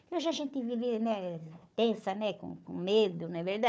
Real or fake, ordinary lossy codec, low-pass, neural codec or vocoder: fake; none; none; codec, 16 kHz, 4 kbps, FunCodec, trained on Chinese and English, 50 frames a second